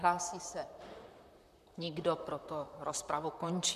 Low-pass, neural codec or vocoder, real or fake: 14.4 kHz; vocoder, 44.1 kHz, 128 mel bands, Pupu-Vocoder; fake